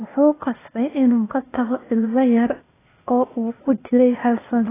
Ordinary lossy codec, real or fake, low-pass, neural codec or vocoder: AAC, 16 kbps; fake; 3.6 kHz; codec, 16 kHz, 0.8 kbps, ZipCodec